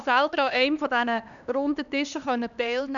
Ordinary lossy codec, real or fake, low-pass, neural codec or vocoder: none; fake; 7.2 kHz; codec, 16 kHz, 2 kbps, X-Codec, HuBERT features, trained on LibriSpeech